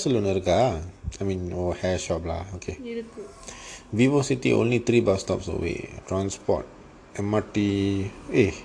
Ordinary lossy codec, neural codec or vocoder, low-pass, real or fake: AAC, 48 kbps; none; 9.9 kHz; real